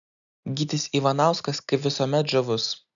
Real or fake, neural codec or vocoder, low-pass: real; none; 7.2 kHz